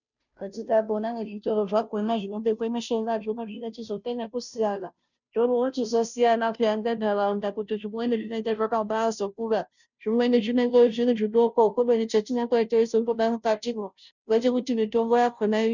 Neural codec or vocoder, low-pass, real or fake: codec, 16 kHz, 0.5 kbps, FunCodec, trained on Chinese and English, 25 frames a second; 7.2 kHz; fake